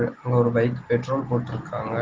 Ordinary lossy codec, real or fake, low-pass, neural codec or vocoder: Opus, 16 kbps; real; 7.2 kHz; none